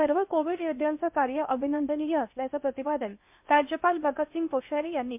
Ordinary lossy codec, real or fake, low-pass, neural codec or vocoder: MP3, 32 kbps; fake; 3.6 kHz; codec, 16 kHz in and 24 kHz out, 0.6 kbps, FocalCodec, streaming, 2048 codes